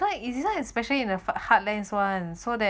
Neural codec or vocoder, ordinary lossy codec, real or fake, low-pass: none; none; real; none